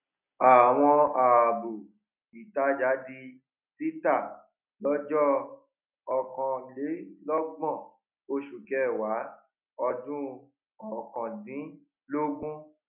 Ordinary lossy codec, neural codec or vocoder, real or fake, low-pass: none; none; real; 3.6 kHz